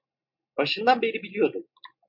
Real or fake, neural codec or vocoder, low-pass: real; none; 5.4 kHz